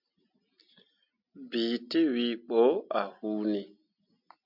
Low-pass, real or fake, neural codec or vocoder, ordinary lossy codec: 5.4 kHz; real; none; MP3, 48 kbps